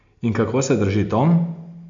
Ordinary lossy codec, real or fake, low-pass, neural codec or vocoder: none; real; 7.2 kHz; none